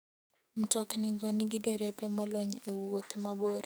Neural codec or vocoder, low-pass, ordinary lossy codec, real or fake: codec, 44.1 kHz, 2.6 kbps, SNAC; none; none; fake